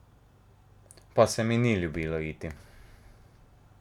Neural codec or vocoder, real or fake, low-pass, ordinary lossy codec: none; real; 19.8 kHz; none